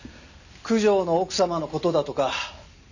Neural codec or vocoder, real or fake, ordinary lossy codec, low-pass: none; real; none; 7.2 kHz